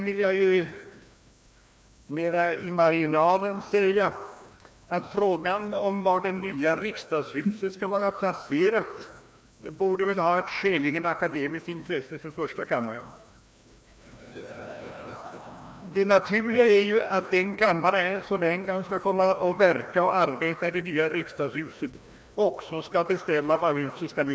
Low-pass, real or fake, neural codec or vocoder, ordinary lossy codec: none; fake; codec, 16 kHz, 1 kbps, FreqCodec, larger model; none